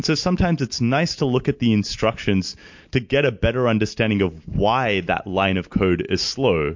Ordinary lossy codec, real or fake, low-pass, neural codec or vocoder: MP3, 48 kbps; real; 7.2 kHz; none